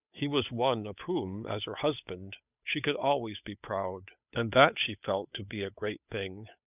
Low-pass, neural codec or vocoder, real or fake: 3.6 kHz; codec, 16 kHz, 8 kbps, FunCodec, trained on Chinese and English, 25 frames a second; fake